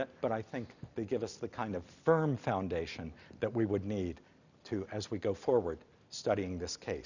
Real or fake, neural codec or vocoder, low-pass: real; none; 7.2 kHz